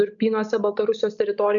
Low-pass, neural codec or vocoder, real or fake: 7.2 kHz; none; real